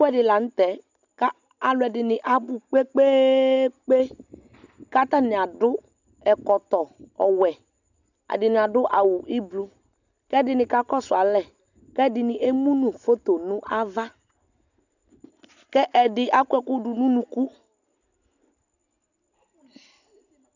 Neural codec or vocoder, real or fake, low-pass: none; real; 7.2 kHz